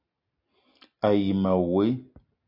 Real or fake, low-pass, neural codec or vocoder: real; 5.4 kHz; none